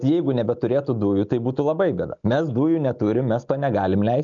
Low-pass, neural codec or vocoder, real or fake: 7.2 kHz; none; real